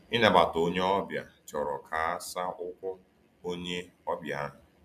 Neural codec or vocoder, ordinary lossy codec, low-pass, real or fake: none; none; 14.4 kHz; real